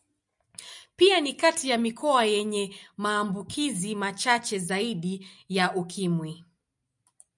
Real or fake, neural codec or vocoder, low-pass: real; none; 10.8 kHz